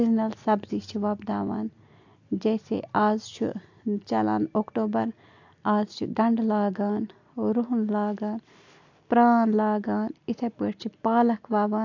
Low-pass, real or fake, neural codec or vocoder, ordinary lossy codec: 7.2 kHz; real; none; AAC, 48 kbps